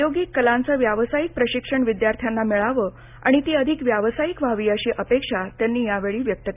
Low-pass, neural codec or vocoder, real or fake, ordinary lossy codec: 3.6 kHz; none; real; none